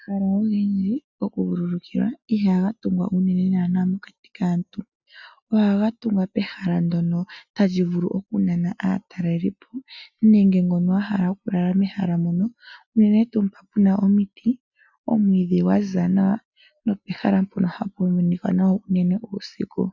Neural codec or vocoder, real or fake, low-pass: none; real; 7.2 kHz